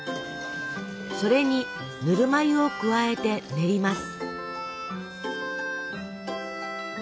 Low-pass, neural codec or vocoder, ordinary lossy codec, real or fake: none; none; none; real